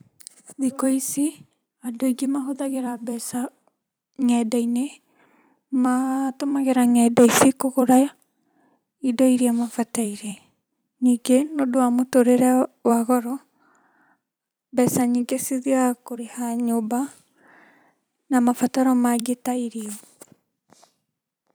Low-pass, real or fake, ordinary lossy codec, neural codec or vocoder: none; fake; none; vocoder, 44.1 kHz, 128 mel bands every 256 samples, BigVGAN v2